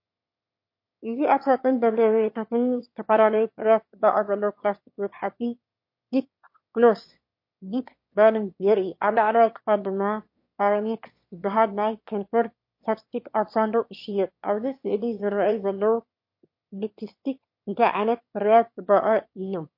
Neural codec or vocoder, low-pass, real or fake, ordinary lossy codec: autoencoder, 22.05 kHz, a latent of 192 numbers a frame, VITS, trained on one speaker; 5.4 kHz; fake; MP3, 32 kbps